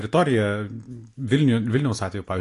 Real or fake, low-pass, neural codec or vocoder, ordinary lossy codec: real; 10.8 kHz; none; AAC, 48 kbps